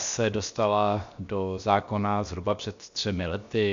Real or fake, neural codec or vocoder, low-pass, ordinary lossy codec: fake; codec, 16 kHz, 0.7 kbps, FocalCodec; 7.2 kHz; MP3, 64 kbps